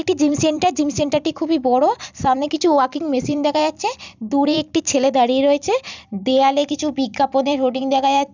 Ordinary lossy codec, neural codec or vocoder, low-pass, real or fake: none; vocoder, 44.1 kHz, 128 mel bands every 256 samples, BigVGAN v2; 7.2 kHz; fake